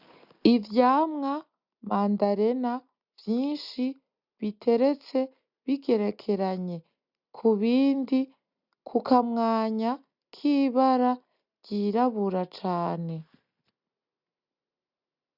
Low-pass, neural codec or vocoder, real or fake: 5.4 kHz; none; real